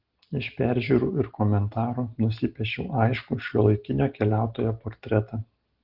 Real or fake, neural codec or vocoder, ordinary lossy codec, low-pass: real; none; Opus, 16 kbps; 5.4 kHz